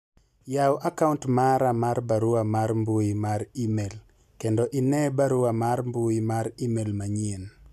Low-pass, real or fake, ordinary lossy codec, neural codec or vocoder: 14.4 kHz; real; none; none